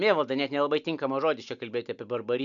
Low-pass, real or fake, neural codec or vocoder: 7.2 kHz; real; none